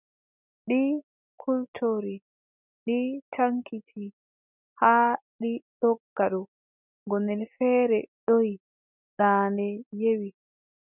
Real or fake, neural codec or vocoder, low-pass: real; none; 3.6 kHz